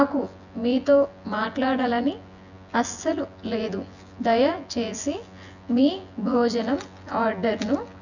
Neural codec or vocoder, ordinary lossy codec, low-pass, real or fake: vocoder, 24 kHz, 100 mel bands, Vocos; none; 7.2 kHz; fake